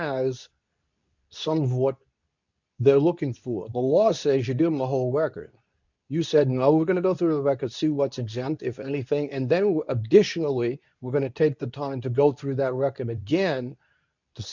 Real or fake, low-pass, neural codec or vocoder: fake; 7.2 kHz; codec, 24 kHz, 0.9 kbps, WavTokenizer, medium speech release version 2